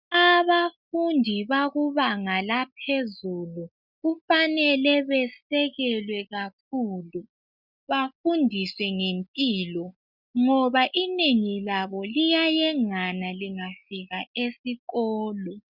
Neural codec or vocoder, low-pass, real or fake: none; 5.4 kHz; real